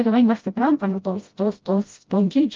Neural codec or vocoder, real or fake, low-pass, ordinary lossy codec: codec, 16 kHz, 0.5 kbps, FreqCodec, smaller model; fake; 7.2 kHz; Opus, 24 kbps